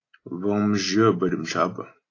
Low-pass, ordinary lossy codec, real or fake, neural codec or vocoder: 7.2 kHz; AAC, 32 kbps; real; none